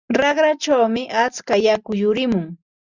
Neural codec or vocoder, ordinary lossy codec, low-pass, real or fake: none; Opus, 64 kbps; 7.2 kHz; real